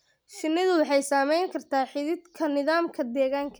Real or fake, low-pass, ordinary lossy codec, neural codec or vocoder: real; none; none; none